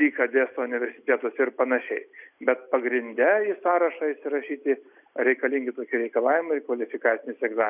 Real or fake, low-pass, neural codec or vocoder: real; 3.6 kHz; none